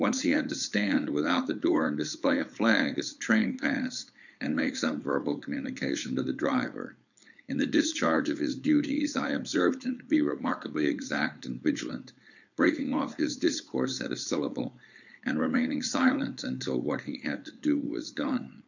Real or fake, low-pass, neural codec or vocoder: fake; 7.2 kHz; codec, 16 kHz, 4.8 kbps, FACodec